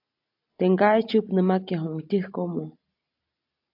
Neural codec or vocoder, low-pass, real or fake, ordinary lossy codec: none; 5.4 kHz; real; AAC, 48 kbps